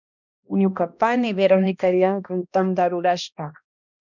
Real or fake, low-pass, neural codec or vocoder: fake; 7.2 kHz; codec, 16 kHz, 1 kbps, X-Codec, HuBERT features, trained on balanced general audio